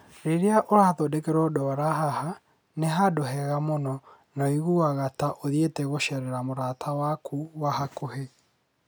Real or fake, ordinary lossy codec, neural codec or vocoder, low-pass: real; none; none; none